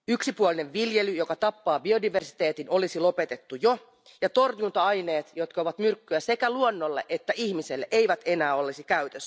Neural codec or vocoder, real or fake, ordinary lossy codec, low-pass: none; real; none; none